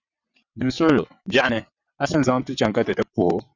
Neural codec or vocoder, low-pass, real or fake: vocoder, 22.05 kHz, 80 mel bands, WaveNeXt; 7.2 kHz; fake